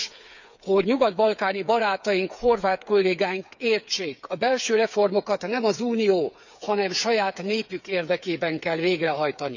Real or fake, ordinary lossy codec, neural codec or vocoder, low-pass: fake; none; codec, 16 kHz, 8 kbps, FreqCodec, smaller model; 7.2 kHz